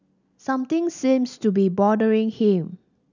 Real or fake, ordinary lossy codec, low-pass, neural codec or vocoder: real; none; 7.2 kHz; none